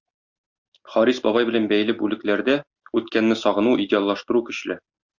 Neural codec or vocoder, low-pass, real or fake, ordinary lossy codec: none; 7.2 kHz; real; Opus, 64 kbps